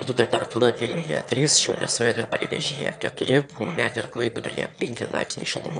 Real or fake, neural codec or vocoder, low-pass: fake; autoencoder, 22.05 kHz, a latent of 192 numbers a frame, VITS, trained on one speaker; 9.9 kHz